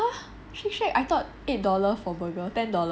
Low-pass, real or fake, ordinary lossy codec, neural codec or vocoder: none; real; none; none